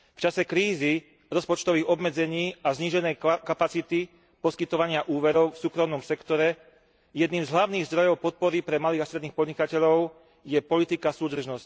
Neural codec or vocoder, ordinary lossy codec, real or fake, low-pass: none; none; real; none